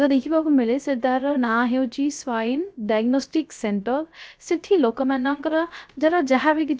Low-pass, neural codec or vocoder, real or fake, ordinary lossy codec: none; codec, 16 kHz, 0.3 kbps, FocalCodec; fake; none